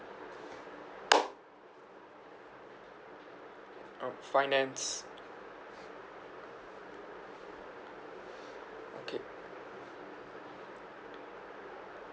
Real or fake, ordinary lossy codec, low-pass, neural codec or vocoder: real; none; none; none